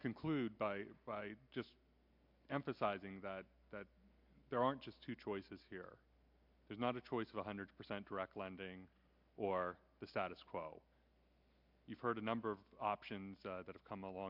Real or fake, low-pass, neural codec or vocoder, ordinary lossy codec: real; 5.4 kHz; none; MP3, 48 kbps